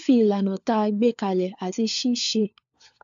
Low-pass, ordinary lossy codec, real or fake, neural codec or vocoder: 7.2 kHz; AAC, 64 kbps; fake; codec, 16 kHz, 4 kbps, FunCodec, trained on LibriTTS, 50 frames a second